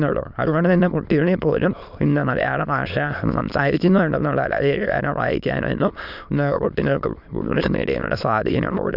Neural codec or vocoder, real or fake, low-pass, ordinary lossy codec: autoencoder, 22.05 kHz, a latent of 192 numbers a frame, VITS, trained on many speakers; fake; 5.4 kHz; none